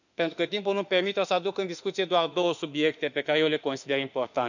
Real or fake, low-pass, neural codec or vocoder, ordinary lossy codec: fake; 7.2 kHz; autoencoder, 48 kHz, 32 numbers a frame, DAC-VAE, trained on Japanese speech; none